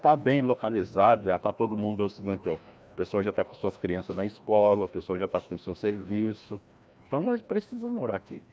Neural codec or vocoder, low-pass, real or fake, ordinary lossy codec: codec, 16 kHz, 1 kbps, FreqCodec, larger model; none; fake; none